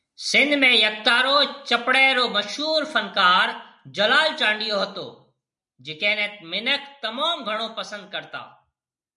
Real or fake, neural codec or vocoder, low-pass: real; none; 10.8 kHz